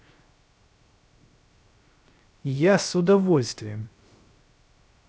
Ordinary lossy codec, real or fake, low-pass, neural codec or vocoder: none; fake; none; codec, 16 kHz, 0.3 kbps, FocalCodec